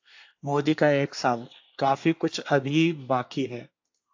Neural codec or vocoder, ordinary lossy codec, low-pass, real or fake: codec, 24 kHz, 1 kbps, SNAC; AAC, 48 kbps; 7.2 kHz; fake